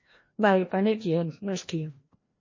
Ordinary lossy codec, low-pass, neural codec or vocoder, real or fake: MP3, 32 kbps; 7.2 kHz; codec, 16 kHz, 1 kbps, FreqCodec, larger model; fake